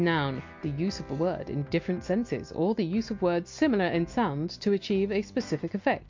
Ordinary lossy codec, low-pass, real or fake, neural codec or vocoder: MP3, 48 kbps; 7.2 kHz; real; none